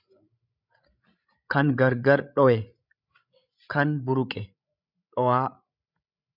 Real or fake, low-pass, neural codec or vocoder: fake; 5.4 kHz; codec, 16 kHz, 16 kbps, FreqCodec, larger model